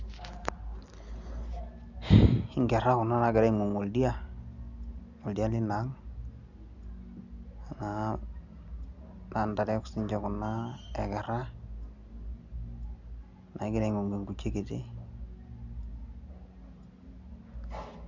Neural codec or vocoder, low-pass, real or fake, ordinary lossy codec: none; 7.2 kHz; real; none